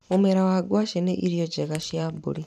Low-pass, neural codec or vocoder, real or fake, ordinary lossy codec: 14.4 kHz; vocoder, 44.1 kHz, 128 mel bands every 512 samples, BigVGAN v2; fake; none